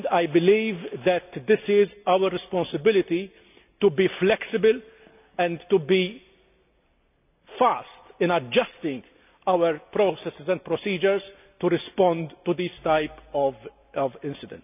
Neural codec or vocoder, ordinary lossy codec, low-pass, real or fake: none; none; 3.6 kHz; real